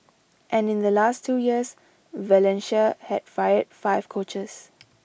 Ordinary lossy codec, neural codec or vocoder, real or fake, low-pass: none; none; real; none